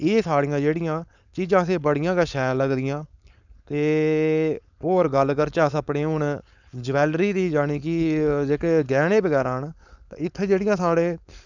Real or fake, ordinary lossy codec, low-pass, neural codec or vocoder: fake; none; 7.2 kHz; codec, 16 kHz, 4.8 kbps, FACodec